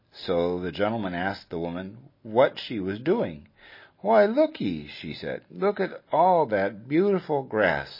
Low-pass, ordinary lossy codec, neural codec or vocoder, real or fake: 5.4 kHz; MP3, 24 kbps; none; real